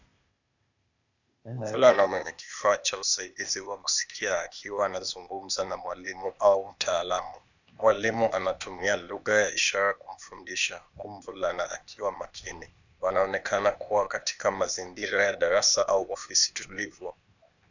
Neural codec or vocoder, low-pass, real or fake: codec, 16 kHz, 0.8 kbps, ZipCodec; 7.2 kHz; fake